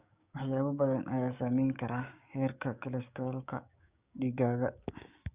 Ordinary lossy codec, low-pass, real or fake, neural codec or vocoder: Opus, 64 kbps; 3.6 kHz; fake; codec, 16 kHz, 6 kbps, DAC